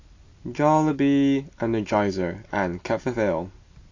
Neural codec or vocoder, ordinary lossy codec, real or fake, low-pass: none; AAC, 48 kbps; real; 7.2 kHz